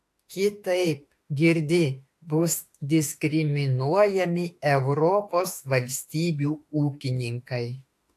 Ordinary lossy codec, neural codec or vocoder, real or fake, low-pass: AAC, 64 kbps; autoencoder, 48 kHz, 32 numbers a frame, DAC-VAE, trained on Japanese speech; fake; 14.4 kHz